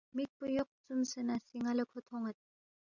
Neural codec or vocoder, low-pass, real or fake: none; 7.2 kHz; real